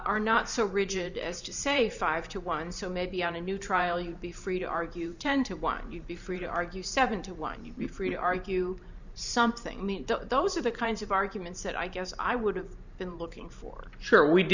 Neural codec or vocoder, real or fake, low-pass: vocoder, 44.1 kHz, 128 mel bands every 512 samples, BigVGAN v2; fake; 7.2 kHz